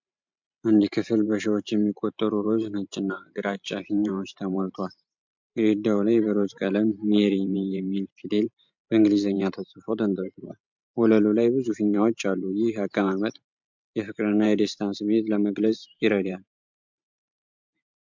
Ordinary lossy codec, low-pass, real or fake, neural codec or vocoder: MP3, 64 kbps; 7.2 kHz; fake; vocoder, 44.1 kHz, 128 mel bands every 512 samples, BigVGAN v2